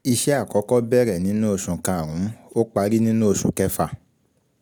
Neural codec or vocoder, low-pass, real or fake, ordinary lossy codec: none; none; real; none